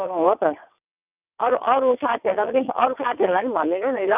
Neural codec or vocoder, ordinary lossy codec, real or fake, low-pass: vocoder, 22.05 kHz, 80 mel bands, WaveNeXt; none; fake; 3.6 kHz